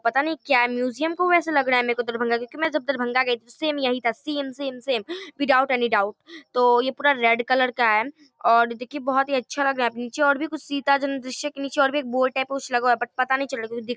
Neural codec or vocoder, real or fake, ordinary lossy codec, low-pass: none; real; none; none